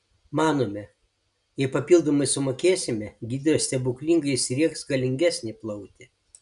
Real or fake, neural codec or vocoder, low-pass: real; none; 10.8 kHz